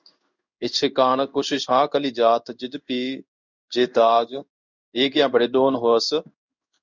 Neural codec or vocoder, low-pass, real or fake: codec, 16 kHz in and 24 kHz out, 1 kbps, XY-Tokenizer; 7.2 kHz; fake